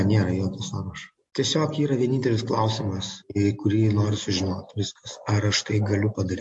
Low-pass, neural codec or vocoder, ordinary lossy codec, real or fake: 10.8 kHz; none; MP3, 48 kbps; real